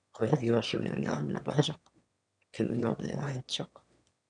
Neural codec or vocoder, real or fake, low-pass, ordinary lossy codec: autoencoder, 22.05 kHz, a latent of 192 numbers a frame, VITS, trained on one speaker; fake; 9.9 kHz; MP3, 96 kbps